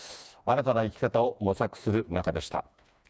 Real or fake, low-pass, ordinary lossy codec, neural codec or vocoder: fake; none; none; codec, 16 kHz, 2 kbps, FreqCodec, smaller model